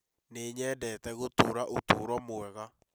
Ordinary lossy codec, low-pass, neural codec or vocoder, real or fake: none; none; none; real